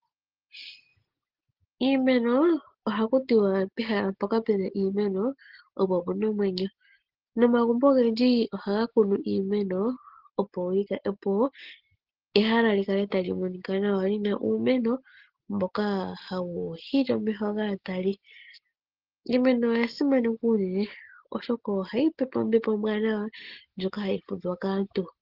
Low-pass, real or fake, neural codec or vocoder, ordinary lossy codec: 5.4 kHz; real; none; Opus, 16 kbps